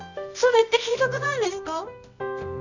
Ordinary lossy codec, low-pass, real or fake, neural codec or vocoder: none; 7.2 kHz; fake; codec, 24 kHz, 0.9 kbps, WavTokenizer, medium music audio release